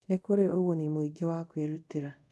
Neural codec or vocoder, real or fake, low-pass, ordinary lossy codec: codec, 24 kHz, 0.5 kbps, DualCodec; fake; none; none